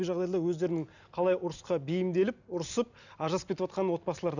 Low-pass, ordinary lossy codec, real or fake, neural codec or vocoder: 7.2 kHz; none; real; none